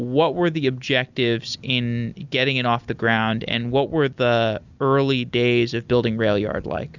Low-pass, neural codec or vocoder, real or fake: 7.2 kHz; none; real